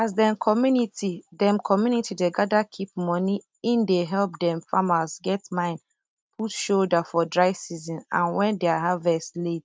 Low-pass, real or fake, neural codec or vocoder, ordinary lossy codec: none; real; none; none